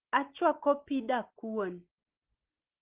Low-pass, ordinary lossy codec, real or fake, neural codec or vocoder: 3.6 kHz; Opus, 16 kbps; real; none